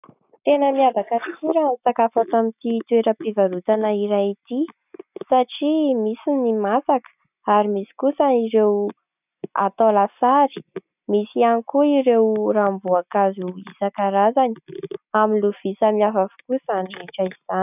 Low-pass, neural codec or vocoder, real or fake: 3.6 kHz; none; real